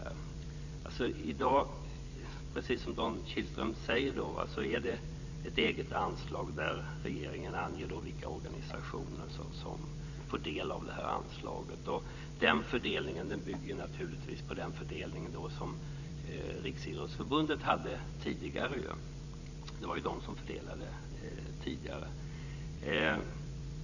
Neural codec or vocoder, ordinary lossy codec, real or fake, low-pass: vocoder, 44.1 kHz, 80 mel bands, Vocos; none; fake; 7.2 kHz